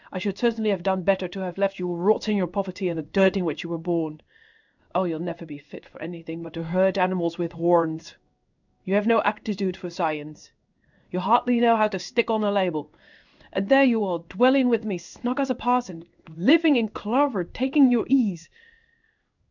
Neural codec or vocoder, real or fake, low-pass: codec, 16 kHz in and 24 kHz out, 1 kbps, XY-Tokenizer; fake; 7.2 kHz